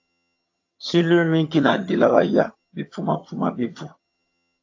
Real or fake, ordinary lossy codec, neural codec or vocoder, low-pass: fake; AAC, 48 kbps; vocoder, 22.05 kHz, 80 mel bands, HiFi-GAN; 7.2 kHz